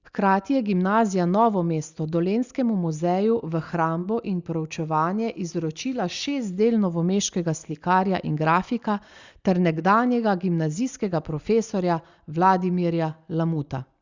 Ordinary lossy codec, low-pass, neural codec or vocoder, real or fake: Opus, 64 kbps; 7.2 kHz; none; real